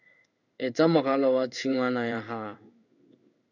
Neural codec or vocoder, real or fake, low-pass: codec, 16 kHz in and 24 kHz out, 1 kbps, XY-Tokenizer; fake; 7.2 kHz